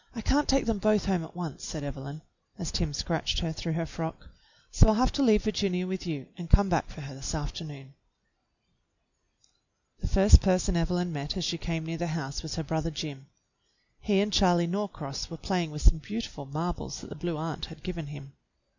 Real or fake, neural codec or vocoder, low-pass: real; none; 7.2 kHz